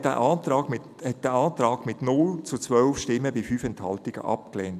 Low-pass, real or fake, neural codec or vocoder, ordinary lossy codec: 14.4 kHz; real; none; none